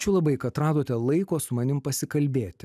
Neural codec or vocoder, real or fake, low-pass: none; real; 14.4 kHz